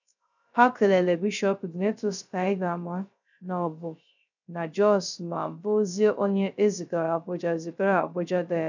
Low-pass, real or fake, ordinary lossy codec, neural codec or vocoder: 7.2 kHz; fake; none; codec, 16 kHz, 0.3 kbps, FocalCodec